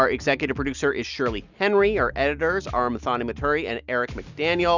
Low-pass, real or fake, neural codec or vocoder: 7.2 kHz; real; none